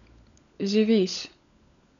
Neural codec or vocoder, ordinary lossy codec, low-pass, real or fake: codec, 16 kHz, 16 kbps, FunCodec, trained on LibriTTS, 50 frames a second; none; 7.2 kHz; fake